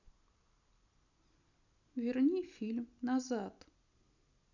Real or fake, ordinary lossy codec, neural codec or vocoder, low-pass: real; none; none; 7.2 kHz